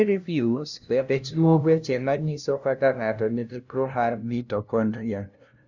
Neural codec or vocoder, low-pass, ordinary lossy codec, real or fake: codec, 16 kHz, 0.5 kbps, FunCodec, trained on LibriTTS, 25 frames a second; 7.2 kHz; none; fake